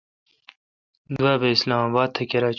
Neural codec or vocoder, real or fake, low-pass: none; real; 7.2 kHz